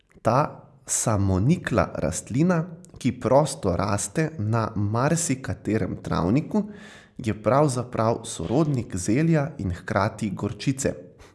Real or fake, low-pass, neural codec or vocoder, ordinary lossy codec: real; none; none; none